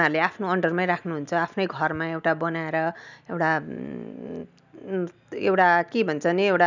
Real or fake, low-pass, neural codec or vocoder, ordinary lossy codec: real; 7.2 kHz; none; none